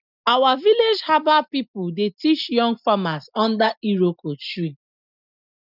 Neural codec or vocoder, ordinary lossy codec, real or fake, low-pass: none; none; real; 5.4 kHz